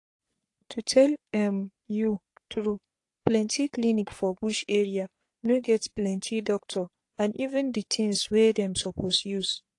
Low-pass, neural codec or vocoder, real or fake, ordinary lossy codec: 10.8 kHz; codec, 44.1 kHz, 3.4 kbps, Pupu-Codec; fake; AAC, 48 kbps